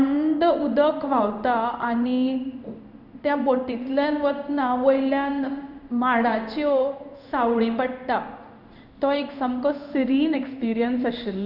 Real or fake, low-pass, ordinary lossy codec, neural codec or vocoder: fake; 5.4 kHz; none; codec, 16 kHz in and 24 kHz out, 1 kbps, XY-Tokenizer